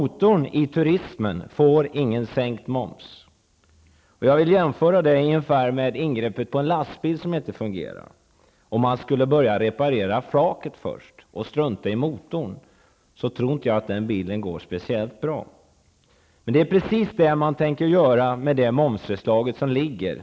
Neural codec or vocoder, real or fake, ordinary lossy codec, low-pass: none; real; none; none